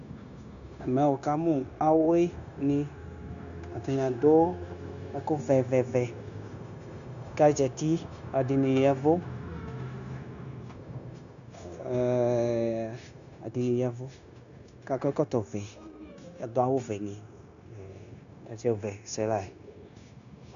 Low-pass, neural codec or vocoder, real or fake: 7.2 kHz; codec, 16 kHz, 0.9 kbps, LongCat-Audio-Codec; fake